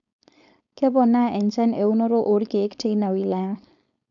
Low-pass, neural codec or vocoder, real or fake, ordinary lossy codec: 7.2 kHz; codec, 16 kHz, 4.8 kbps, FACodec; fake; none